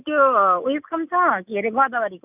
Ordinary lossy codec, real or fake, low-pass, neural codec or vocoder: none; real; 3.6 kHz; none